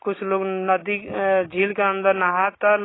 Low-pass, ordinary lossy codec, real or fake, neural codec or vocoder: 7.2 kHz; AAC, 16 kbps; real; none